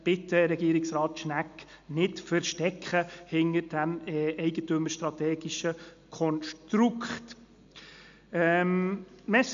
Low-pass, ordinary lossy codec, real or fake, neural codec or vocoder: 7.2 kHz; MP3, 64 kbps; real; none